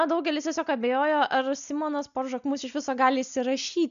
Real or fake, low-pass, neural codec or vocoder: real; 7.2 kHz; none